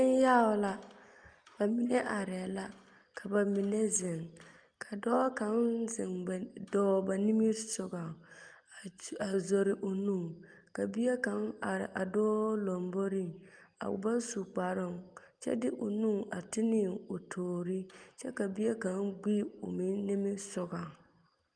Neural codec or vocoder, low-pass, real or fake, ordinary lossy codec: none; 9.9 kHz; real; Opus, 32 kbps